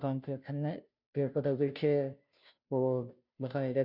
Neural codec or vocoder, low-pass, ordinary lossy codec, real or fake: codec, 16 kHz, 0.5 kbps, FunCodec, trained on Chinese and English, 25 frames a second; 5.4 kHz; none; fake